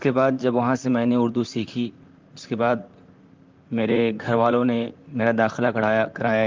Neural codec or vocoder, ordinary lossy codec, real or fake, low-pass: vocoder, 44.1 kHz, 128 mel bands, Pupu-Vocoder; Opus, 24 kbps; fake; 7.2 kHz